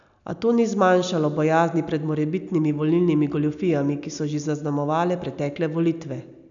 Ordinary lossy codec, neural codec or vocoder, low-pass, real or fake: none; none; 7.2 kHz; real